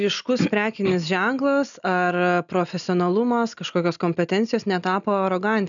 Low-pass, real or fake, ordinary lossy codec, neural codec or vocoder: 7.2 kHz; real; MP3, 96 kbps; none